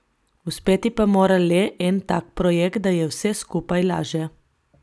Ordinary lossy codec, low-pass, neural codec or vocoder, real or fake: none; none; none; real